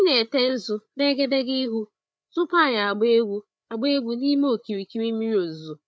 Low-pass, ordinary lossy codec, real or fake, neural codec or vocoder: none; none; fake; codec, 16 kHz, 8 kbps, FreqCodec, larger model